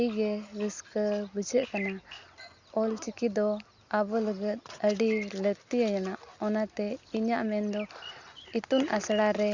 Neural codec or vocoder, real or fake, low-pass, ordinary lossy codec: none; real; 7.2 kHz; Opus, 64 kbps